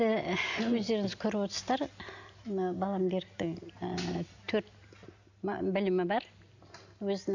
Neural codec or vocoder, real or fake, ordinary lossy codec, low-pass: none; real; none; 7.2 kHz